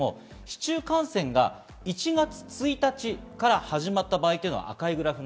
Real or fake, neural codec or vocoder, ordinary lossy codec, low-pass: real; none; none; none